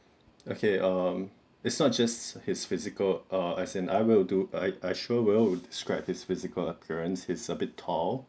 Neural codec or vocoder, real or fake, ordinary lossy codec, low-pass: none; real; none; none